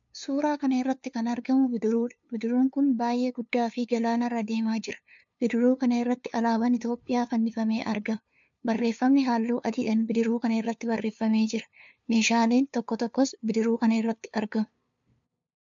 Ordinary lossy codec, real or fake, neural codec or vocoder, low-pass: MP3, 64 kbps; fake; codec, 16 kHz, 2 kbps, FunCodec, trained on LibriTTS, 25 frames a second; 7.2 kHz